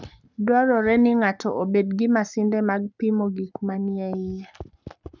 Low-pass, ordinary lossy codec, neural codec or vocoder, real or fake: 7.2 kHz; none; codec, 44.1 kHz, 7.8 kbps, Pupu-Codec; fake